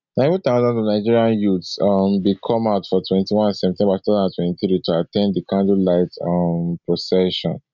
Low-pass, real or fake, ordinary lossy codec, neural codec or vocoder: 7.2 kHz; real; none; none